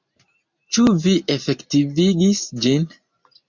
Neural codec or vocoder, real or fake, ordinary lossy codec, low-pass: none; real; MP3, 64 kbps; 7.2 kHz